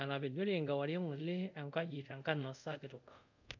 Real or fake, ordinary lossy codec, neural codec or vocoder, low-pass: fake; none; codec, 24 kHz, 0.5 kbps, DualCodec; 7.2 kHz